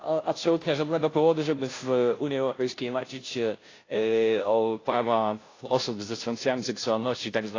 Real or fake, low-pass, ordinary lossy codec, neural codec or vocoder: fake; 7.2 kHz; AAC, 32 kbps; codec, 16 kHz, 0.5 kbps, FunCodec, trained on Chinese and English, 25 frames a second